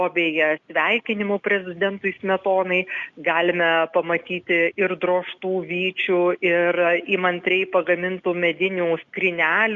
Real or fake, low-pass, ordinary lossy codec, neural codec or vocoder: real; 7.2 kHz; Opus, 64 kbps; none